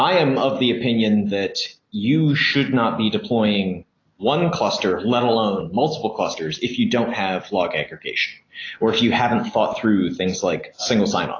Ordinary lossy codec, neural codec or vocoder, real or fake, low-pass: AAC, 32 kbps; none; real; 7.2 kHz